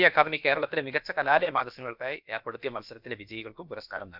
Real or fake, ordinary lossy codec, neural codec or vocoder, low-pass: fake; none; codec, 16 kHz, about 1 kbps, DyCAST, with the encoder's durations; 5.4 kHz